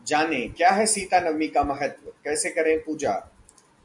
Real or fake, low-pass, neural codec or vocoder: real; 10.8 kHz; none